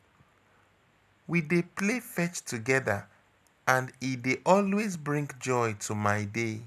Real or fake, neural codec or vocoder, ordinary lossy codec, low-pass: real; none; none; 14.4 kHz